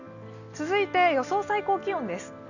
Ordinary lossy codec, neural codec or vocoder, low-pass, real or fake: none; none; 7.2 kHz; real